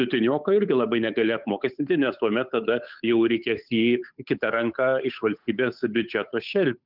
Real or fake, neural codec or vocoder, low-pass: fake; codec, 16 kHz, 8 kbps, FunCodec, trained on Chinese and English, 25 frames a second; 5.4 kHz